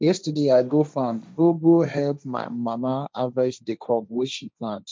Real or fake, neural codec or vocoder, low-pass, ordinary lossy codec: fake; codec, 16 kHz, 1.1 kbps, Voila-Tokenizer; none; none